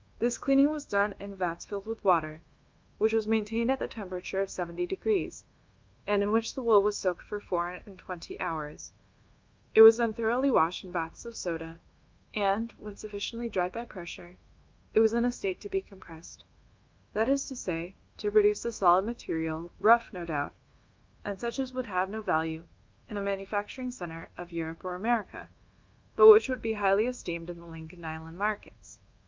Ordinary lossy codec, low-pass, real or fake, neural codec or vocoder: Opus, 32 kbps; 7.2 kHz; fake; codec, 24 kHz, 1.2 kbps, DualCodec